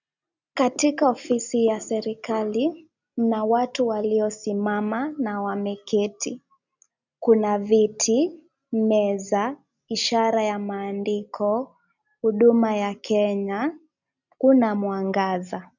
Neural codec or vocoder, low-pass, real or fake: none; 7.2 kHz; real